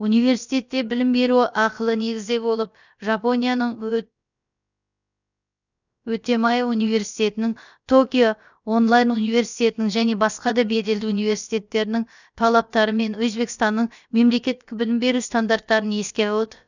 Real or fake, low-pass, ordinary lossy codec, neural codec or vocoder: fake; 7.2 kHz; none; codec, 16 kHz, about 1 kbps, DyCAST, with the encoder's durations